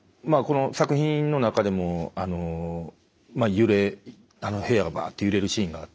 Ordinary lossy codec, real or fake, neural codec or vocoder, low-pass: none; real; none; none